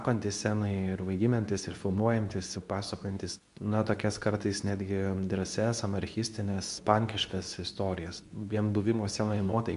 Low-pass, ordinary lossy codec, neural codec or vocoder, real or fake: 10.8 kHz; MP3, 96 kbps; codec, 24 kHz, 0.9 kbps, WavTokenizer, medium speech release version 2; fake